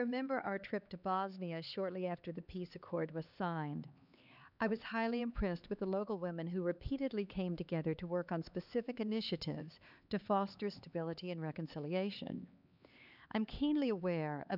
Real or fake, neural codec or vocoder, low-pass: fake; codec, 16 kHz, 4 kbps, X-Codec, HuBERT features, trained on LibriSpeech; 5.4 kHz